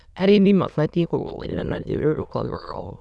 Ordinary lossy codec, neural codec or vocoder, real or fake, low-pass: none; autoencoder, 22.05 kHz, a latent of 192 numbers a frame, VITS, trained on many speakers; fake; none